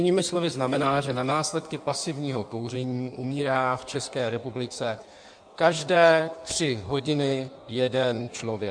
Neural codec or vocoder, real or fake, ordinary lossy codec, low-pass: codec, 16 kHz in and 24 kHz out, 1.1 kbps, FireRedTTS-2 codec; fake; MP3, 96 kbps; 9.9 kHz